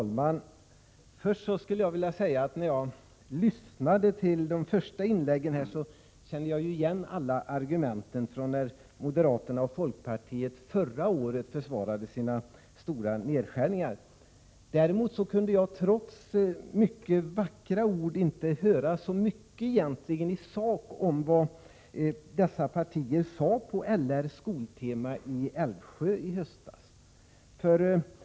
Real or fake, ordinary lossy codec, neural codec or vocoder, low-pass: real; none; none; none